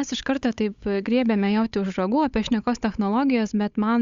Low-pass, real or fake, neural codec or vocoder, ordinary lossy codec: 7.2 kHz; fake; codec, 16 kHz, 8 kbps, FunCodec, trained on Chinese and English, 25 frames a second; Opus, 64 kbps